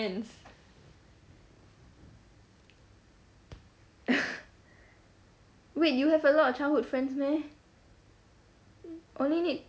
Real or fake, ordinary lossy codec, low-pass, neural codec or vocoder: real; none; none; none